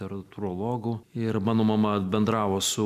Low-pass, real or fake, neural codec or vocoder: 14.4 kHz; real; none